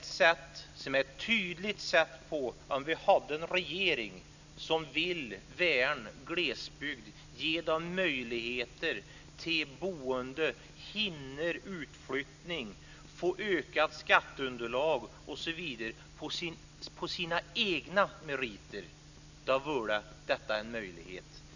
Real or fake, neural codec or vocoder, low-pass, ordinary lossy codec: real; none; 7.2 kHz; none